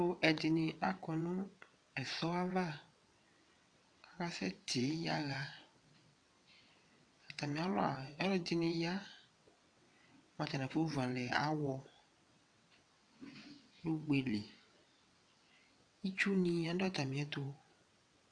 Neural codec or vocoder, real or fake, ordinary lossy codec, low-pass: vocoder, 22.05 kHz, 80 mel bands, WaveNeXt; fake; AAC, 64 kbps; 9.9 kHz